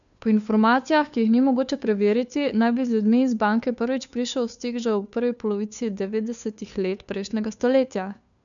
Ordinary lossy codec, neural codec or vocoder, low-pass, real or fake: none; codec, 16 kHz, 2 kbps, FunCodec, trained on Chinese and English, 25 frames a second; 7.2 kHz; fake